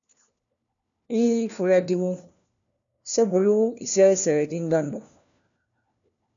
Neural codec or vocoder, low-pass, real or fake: codec, 16 kHz, 1 kbps, FunCodec, trained on LibriTTS, 50 frames a second; 7.2 kHz; fake